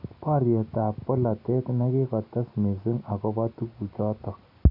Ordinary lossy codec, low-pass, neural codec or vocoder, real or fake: MP3, 48 kbps; 5.4 kHz; none; real